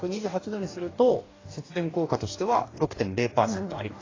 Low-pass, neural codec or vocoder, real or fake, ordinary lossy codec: 7.2 kHz; codec, 44.1 kHz, 2.6 kbps, DAC; fake; AAC, 32 kbps